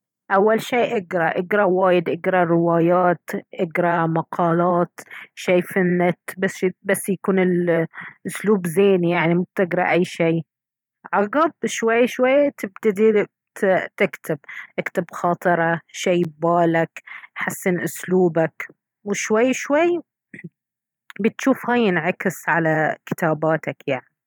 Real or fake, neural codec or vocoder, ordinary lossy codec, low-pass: fake; vocoder, 44.1 kHz, 128 mel bands every 512 samples, BigVGAN v2; none; 19.8 kHz